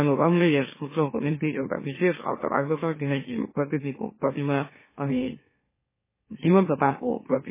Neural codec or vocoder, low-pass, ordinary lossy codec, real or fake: autoencoder, 44.1 kHz, a latent of 192 numbers a frame, MeloTTS; 3.6 kHz; MP3, 16 kbps; fake